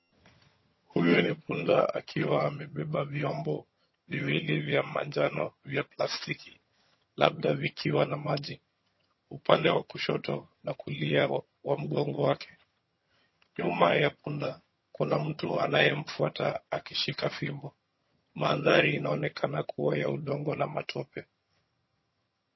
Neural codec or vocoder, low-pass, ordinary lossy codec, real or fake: vocoder, 22.05 kHz, 80 mel bands, HiFi-GAN; 7.2 kHz; MP3, 24 kbps; fake